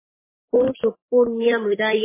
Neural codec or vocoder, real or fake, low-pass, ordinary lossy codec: codec, 44.1 kHz, 3.4 kbps, Pupu-Codec; fake; 3.6 kHz; MP3, 16 kbps